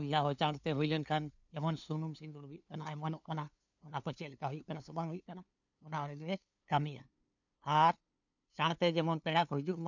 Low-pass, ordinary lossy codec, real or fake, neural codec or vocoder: 7.2 kHz; none; fake; codec, 16 kHz, 2 kbps, FunCodec, trained on LibriTTS, 25 frames a second